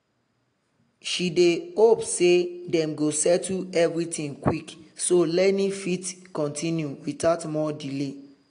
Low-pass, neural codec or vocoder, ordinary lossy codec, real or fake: 9.9 kHz; none; AAC, 48 kbps; real